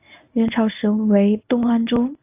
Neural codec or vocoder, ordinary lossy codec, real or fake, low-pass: codec, 24 kHz, 0.9 kbps, WavTokenizer, medium speech release version 1; AAC, 24 kbps; fake; 3.6 kHz